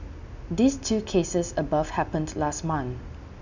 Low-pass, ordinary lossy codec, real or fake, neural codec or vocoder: 7.2 kHz; none; real; none